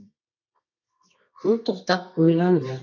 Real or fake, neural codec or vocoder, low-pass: fake; codec, 24 kHz, 0.9 kbps, WavTokenizer, medium music audio release; 7.2 kHz